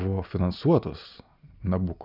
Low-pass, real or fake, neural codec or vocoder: 5.4 kHz; real; none